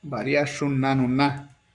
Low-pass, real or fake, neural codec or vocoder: 10.8 kHz; fake; codec, 44.1 kHz, 7.8 kbps, Pupu-Codec